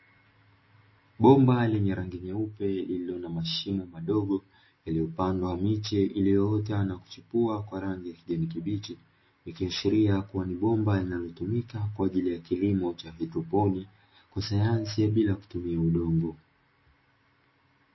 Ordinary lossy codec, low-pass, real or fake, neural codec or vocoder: MP3, 24 kbps; 7.2 kHz; real; none